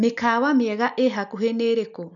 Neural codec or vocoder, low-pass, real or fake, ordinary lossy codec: none; 7.2 kHz; real; none